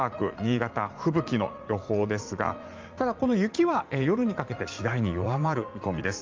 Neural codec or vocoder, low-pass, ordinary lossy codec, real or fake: vocoder, 44.1 kHz, 80 mel bands, Vocos; 7.2 kHz; Opus, 24 kbps; fake